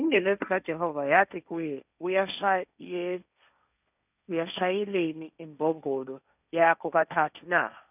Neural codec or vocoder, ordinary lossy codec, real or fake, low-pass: codec, 16 kHz, 1.1 kbps, Voila-Tokenizer; none; fake; 3.6 kHz